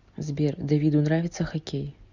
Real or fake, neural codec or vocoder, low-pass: real; none; 7.2 kHz